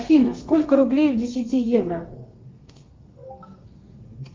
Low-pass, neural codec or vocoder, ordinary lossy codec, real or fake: 7.2 kHz; codec, 32 kHz, 1.9 kbps, SNAC; Opus, 32 kbps; fake